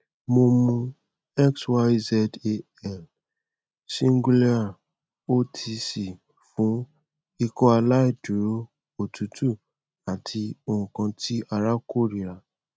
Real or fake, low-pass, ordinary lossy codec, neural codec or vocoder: real; none; none; none